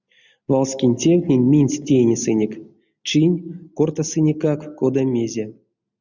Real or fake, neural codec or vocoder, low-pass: real; none; 7.2 kHz